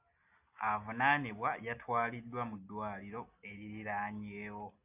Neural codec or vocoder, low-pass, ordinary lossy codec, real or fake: none; 3.6 kHz; AAC, 32 kbps; real